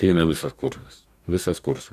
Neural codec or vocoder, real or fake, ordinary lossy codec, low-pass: codec, 44.1 kHz, 2.6 kbps, DAC; fake; AAC, 96 kbps; 14.4 kHz